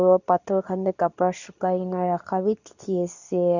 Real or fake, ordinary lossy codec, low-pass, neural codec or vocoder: fake; none; 7.2 kHz; codec, 24 kHz, 0.9 kbps, WavTokenizer, medium speech release version 2